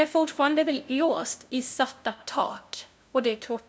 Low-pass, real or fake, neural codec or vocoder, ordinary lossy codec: none; fake; codec, 16 kHz, 0.5 kbps, FunCodec, trained on LibriTTS, 25 frames a second; none